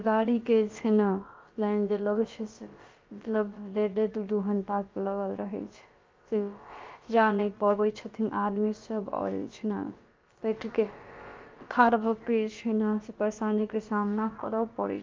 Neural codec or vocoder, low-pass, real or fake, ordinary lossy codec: codec, 16 kHz, about 1 kbps, DyCAST, with the encoder's durations; 7.2 kHz; fake; Opus, 24 kbps